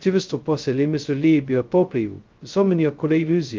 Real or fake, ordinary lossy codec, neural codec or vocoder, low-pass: fake; Opus, 32 kbps; codec, 16 kHz, 0.2 kbps, FocalCodec; 7.2 kHz